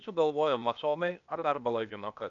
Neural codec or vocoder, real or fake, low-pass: codec, 16 kHz, 0.8 kbps, ZipCodec; fake; 7.2 kHz